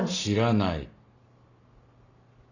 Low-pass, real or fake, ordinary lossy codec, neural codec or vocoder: 7.2 kHz; real; none; none